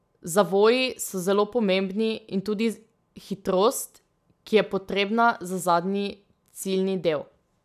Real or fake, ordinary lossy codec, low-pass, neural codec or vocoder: real; none; 14.4 kHz; none